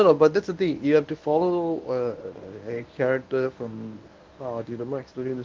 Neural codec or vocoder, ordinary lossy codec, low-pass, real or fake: codec, 24 kHz, 0.9 kbps, WavTokenizer, medium speech release version 1; Opus, 24 kbps; 7.2 kHz; fake